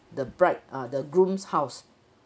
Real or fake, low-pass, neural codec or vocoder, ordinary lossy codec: real; none; none; none